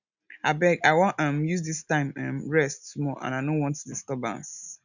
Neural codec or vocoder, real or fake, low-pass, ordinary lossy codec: none; real; 7.2 kHz; none